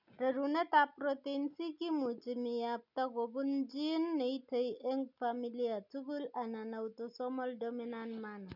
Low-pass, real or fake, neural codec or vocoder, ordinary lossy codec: 5.4 kHz; real; none; none